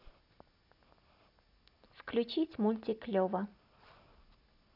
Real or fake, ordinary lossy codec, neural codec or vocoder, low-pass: real; none; none; 5.4 kHz